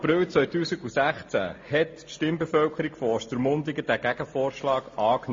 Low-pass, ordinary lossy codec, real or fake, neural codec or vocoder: 7.2 kHz; MP3, 32 kbps; real; none